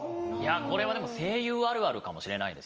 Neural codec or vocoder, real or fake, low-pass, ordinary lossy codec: none; real; 7.2 kHz; Opus, 24 kbps